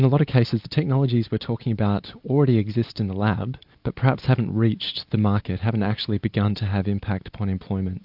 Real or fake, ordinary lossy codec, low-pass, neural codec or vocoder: real; AAC, 48 kbps; 5.4 kHz; none